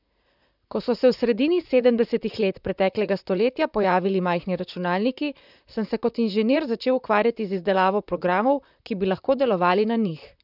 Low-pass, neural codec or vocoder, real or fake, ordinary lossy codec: 5.4 kHz; vocoder, 44.1 kHz, 128 mel bands, Pupu-Vocoder; fake; none